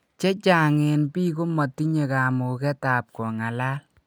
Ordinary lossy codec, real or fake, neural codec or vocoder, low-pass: none; real; none; none